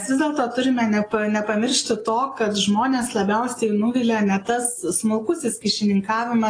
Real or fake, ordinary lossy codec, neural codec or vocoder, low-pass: real; AAC, 32 kbps; none; 9.9 kHz